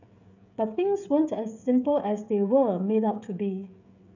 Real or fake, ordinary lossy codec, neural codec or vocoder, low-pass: fake; none; codec, 16 kHz, 16 kbps, FreqCodec, smaller model; 7.2 kHz